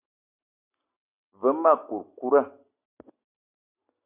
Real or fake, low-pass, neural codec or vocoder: real; 3.6 kHz; none